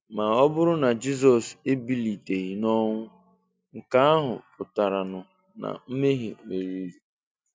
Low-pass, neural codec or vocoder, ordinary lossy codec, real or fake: none; none; none; real